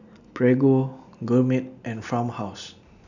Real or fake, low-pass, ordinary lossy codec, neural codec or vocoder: real; 7.2 kHz; none; none